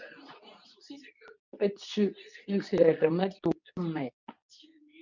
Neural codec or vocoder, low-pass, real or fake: codec, 24 kHz, 0.9 kbps, WavTokenizer, medium speech release version 2; 7.2 kHz; fake